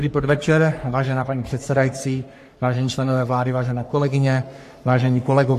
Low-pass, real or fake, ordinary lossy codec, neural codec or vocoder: 14.4 kHz; fake; AAC, 64 kbps; codec, 44.1 kHz, 3.4 kbps, Pupu-Codec